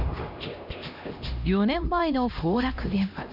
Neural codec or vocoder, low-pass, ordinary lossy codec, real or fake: codec, 16 kHz, 1 kbps, X-Codec, HuBERT features, trained on LibriSpeech; 5.4 kHz; none; fake